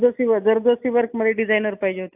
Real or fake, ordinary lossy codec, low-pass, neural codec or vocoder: real; AAC, 32 kbps; 3.6 kHz; none